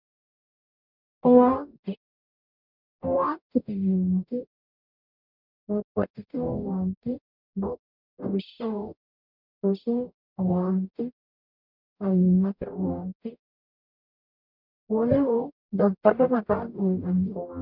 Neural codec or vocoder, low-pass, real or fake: codec, 44.1 kHz, 0.9 kbps, DAC; 5.4 kHz; fake